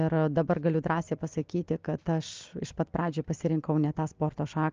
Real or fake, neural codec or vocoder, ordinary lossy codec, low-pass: real; none; Opus, 24 kbps; 7.2 kHz